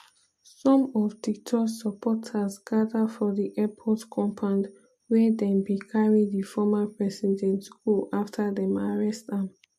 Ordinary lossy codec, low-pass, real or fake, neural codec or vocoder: MP3, 64 kbps; 14.4 kHz; real; none